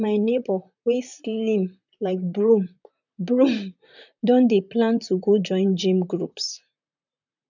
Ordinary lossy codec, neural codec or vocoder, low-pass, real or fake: none; vocoder, 44.1 kHz, 128 mel bands, Pupu-Vocoder; 7.2 kHz; fake